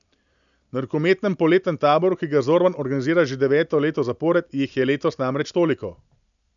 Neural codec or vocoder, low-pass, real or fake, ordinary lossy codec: none; 7.2 kHz; real; none